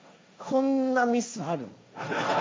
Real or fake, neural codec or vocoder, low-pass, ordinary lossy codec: fake; codec, 16 kHz, 1.1 kbps, Voila-Tokenizer; none; none